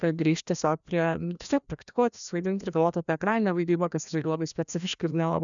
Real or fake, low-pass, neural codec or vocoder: fake; 7.2 kHz; codec, 16 kHz, 1 kbps, FreqCodec, larger model